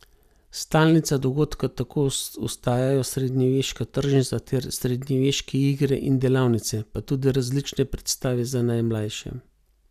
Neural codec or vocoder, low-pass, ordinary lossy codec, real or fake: none; 14.4 kHz; none; real